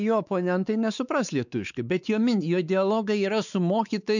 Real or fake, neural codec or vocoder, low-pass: fake; codec, 16 kHz, 4 kbps, X-Codec, WavLM features, trained on Multilingual LibriSpeech; 7.2 kHz